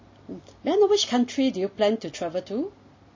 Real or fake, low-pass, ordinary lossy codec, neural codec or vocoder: real; 7.2 kHz; MP3, 32 kbps; none